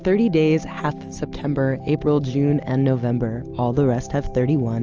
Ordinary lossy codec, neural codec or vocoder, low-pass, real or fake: Opus, 24 kbps; none; 7.2 kHz; real